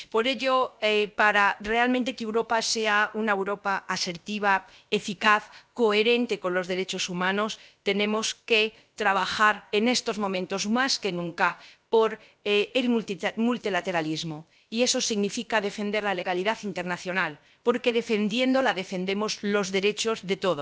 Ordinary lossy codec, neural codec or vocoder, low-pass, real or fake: none; codec, 16 kHz, about 1 kbps, DyCAST, with the encoder's durations; none; fake